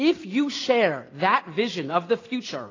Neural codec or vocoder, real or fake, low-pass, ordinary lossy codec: none; real; 7.2 kHz; AAC, 32 kbps